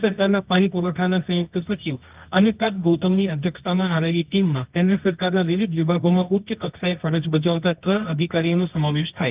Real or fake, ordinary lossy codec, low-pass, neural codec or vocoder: fake; Opus, 32 kbps; 3.6 kHz; codec, 24 kHz, 0.9 kbps, WavTokenizer, medium music audio release